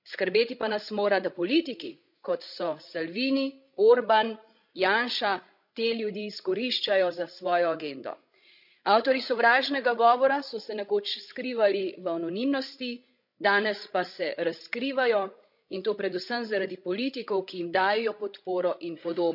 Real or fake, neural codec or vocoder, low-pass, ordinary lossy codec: fake; codec, 16 kHz, 16 kbps, FreqCodec, larger model; 5.4 kHz; none